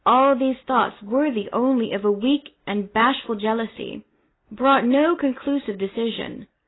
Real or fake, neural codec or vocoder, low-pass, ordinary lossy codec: real; none; 7.2 kHz; AAC, 16 kbps